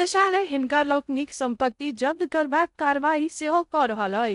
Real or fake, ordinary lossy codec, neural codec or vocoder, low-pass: fake; none; codec, 16 kHz in and 24 kHz out, 0.6 kbps, FocalCodec, streaming, 2048 codes; 10.8 kHz